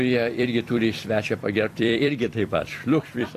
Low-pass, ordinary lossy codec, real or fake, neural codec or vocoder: 14.4 kHz; AAC, 64 kbps; fake; vocoder, 44.1 kHz, 128 mel bands every 256 samples, BigVGAN v2